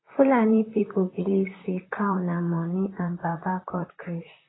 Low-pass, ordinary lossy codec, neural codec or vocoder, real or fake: 7.2 kHz; AAC, 16 kbps; vocoder, 44.1 kHz, 128 mel bands, Pupu-Vocoder; fake